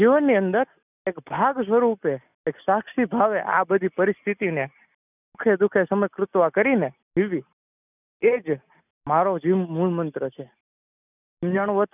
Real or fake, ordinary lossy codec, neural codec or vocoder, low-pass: real; none; none; 3.6 kHz